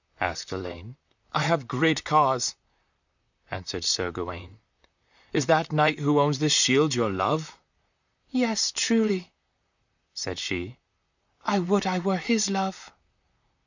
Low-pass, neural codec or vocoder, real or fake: 7.2 kHz; vocoder, 44.1 kHz, 128 mel bands, Pupu-Vocoder; fake